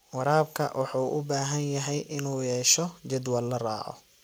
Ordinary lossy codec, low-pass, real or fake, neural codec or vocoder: none; none; real; none